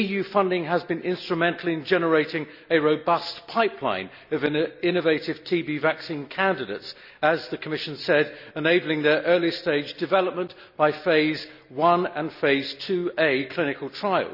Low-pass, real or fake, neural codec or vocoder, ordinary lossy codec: 5.4 kHz; real; none; none